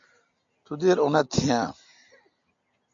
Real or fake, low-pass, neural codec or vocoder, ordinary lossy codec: real; 7.2 kHz; none; AAC, 64 kbps